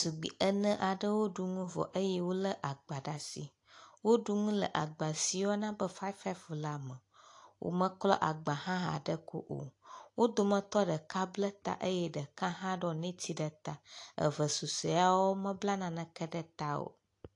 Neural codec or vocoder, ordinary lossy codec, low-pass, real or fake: none; AAC, 48 kbps; 10.8 kHz; real